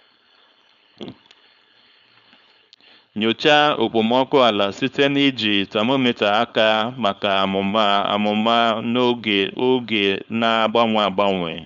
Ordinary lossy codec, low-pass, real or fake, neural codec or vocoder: none; 7.2 kHz; fake; codec, 16 kHz, 4.8 kbps, FACodec